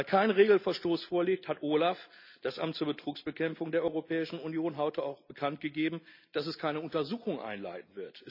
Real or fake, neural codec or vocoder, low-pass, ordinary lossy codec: real; none; 5.4 kHz; none